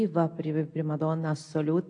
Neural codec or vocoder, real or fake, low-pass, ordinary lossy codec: none; real; 9.9 kHz; AAC, 48 kbps